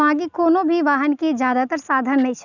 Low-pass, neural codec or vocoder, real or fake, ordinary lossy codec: 7.2 kHz; none; real; none